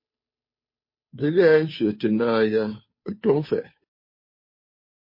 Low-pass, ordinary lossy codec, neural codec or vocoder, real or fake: 5.4 kHz; MP3, 24 kbps; codec, 16 kHz, 2 kbps, FunCodec, trained on Chinese and English, 25 frames a second; fake